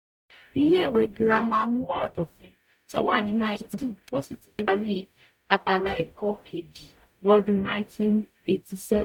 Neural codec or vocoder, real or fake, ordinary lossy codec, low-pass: codec, 44.1 kHz, 0.9 kbps, DAC; fake; none; 19.8 kHz